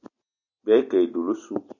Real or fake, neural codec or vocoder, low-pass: real; none; 7.2 kHz